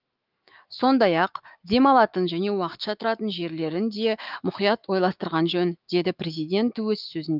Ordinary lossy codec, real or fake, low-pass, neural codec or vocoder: Opus, 24 kbps; fake; 5.4 kHz; autoencoder, 48 kHz, 128 numbers a frame, DAC-VAE, trained on Japanese speech